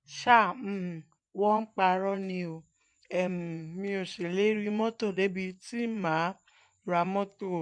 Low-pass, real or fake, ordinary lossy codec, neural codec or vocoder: 9.9 kHz; fake; MP3, 64 kbps; vocoder, 24 kHz, 100 mel bands, Vocos